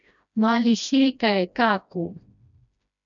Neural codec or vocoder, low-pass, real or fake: codec, 16 kHz, 1 kbps, FreqCodec, smaller model; 7.2 kHz; fake